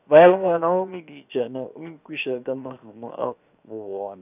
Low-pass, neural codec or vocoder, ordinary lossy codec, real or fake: 3.6 kHz; codec, 16 kHz, 0.7 kbps, FocalCodec; none; fake